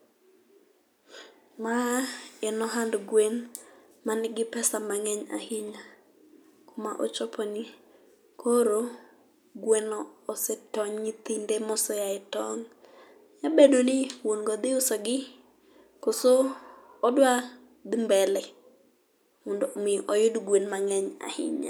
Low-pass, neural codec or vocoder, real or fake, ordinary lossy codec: none; none; real; none